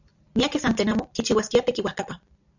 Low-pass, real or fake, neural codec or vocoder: 7.2 kHz; real; none